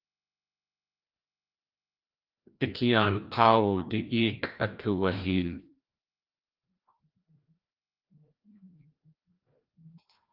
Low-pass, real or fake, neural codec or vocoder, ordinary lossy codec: 5.4 kHz; fake; codec, 16 kHz, 1 kbps, FreqCodec, larger model; Opus, 32 kbps